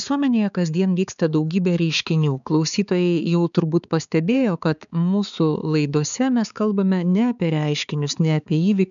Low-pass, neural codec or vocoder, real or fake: 7.2 kHz; codec, 16 kHz, 4 kbps, X-Codec, HuBERT features, trained on balanced general audio; fake